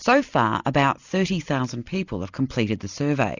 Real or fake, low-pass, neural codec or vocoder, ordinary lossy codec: real; 7.2 kHz; none; Opus, 64 kbps